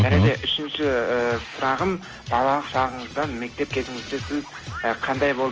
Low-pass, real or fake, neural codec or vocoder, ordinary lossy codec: 7.2 kHz; real; none; Opus, 24 kbps